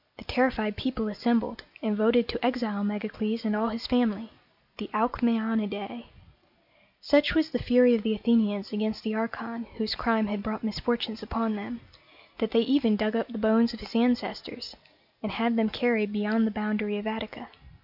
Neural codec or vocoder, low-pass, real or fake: none; 5.4 kHz; real